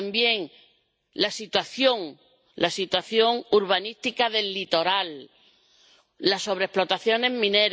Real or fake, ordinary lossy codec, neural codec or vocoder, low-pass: real; none; none; none